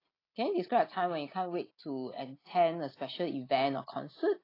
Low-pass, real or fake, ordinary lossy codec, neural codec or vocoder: 5.4 kHz; real; AAC, 24 kbps; none